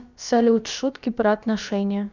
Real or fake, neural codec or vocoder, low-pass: fake; codec, 16 kHz, about 1 kbps, DyCAST, with the encoder's durations; 7.2 kHz